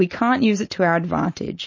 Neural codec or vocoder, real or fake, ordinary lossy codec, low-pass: none; real; MP3, 32 kbps; 7.2 kHz